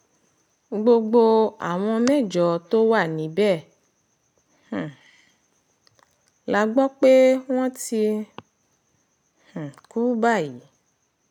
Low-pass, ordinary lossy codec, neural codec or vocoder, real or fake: 19.8 kHz; none; none; real